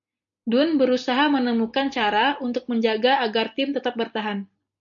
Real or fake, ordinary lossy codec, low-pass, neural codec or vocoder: real; MP3, 96 kbps; 7.2 kHz; none